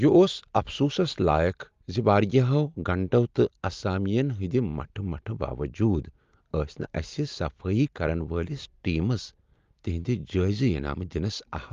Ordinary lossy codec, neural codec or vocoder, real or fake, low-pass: Opus, 32 kbps; none; real; 7.2 kHz